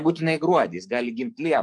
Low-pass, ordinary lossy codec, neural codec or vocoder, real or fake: 10.8 kHz; MP3, 64 kbps; codec, 44.1 kHz, 7.8 kbps, DAC; fake